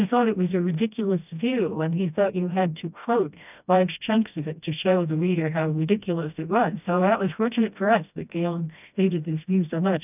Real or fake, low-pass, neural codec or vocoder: fake; 3.6 kHz; codec, 16 kHz, 1 kbps, FreqCodec, smaller model